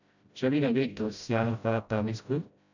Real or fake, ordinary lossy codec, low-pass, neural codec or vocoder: fake; none; 7.2 kHz; codec, 16 kHz, 0.5 kbps, FreqCodec, smaller model